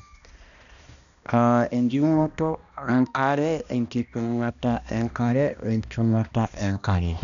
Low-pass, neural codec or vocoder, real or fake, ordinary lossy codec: 7.2 kHz; codec, 16 kHz, 1 kbps, X-Codec, HuBERT features, trained on balanced general audio; fake; none